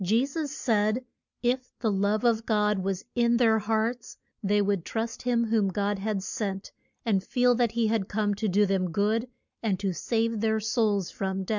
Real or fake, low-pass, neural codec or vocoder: real; 7.2 kHz; none